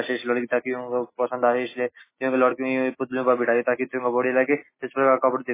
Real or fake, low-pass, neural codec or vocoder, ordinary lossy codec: real; 3.6 kHz; none; MP3, 16 kbps